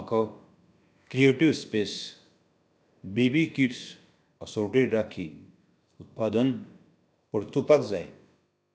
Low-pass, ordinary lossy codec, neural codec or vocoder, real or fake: none; none; codec, 16 kHz, about 1 kbps, DyCAST, with the encoder's durations; fake